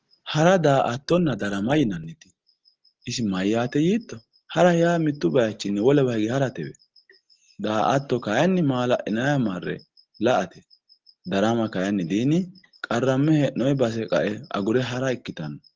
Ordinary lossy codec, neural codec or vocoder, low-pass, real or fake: Opus, 16 kbps; none; 7.2 kHz; real